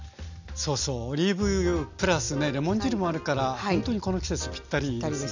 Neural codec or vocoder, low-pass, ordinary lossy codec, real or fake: none; 7.2 kHz; none; real